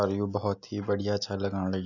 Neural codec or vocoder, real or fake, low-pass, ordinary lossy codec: none; real; 7.2 kHz; none